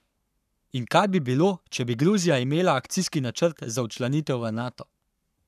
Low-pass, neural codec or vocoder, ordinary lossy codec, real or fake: 14.4 kHz; codec, 44.1 kHz, 7.8 kbps, Pupu-Codec; none; fake